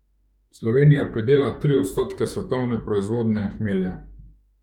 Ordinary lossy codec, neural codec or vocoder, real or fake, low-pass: none; autoencoder, 48 kHz, 32 numbers a frame, DAC-VAE, trained on Japanese speech; fake; 19.8 kHz